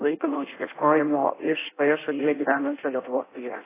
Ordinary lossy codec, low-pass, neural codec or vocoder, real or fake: AAC, 16 kbps; 3.6 kHz; codec, 16 kHz in and 24 kHz out, 0.6 kbps, FireRedTTS-2 codec; fake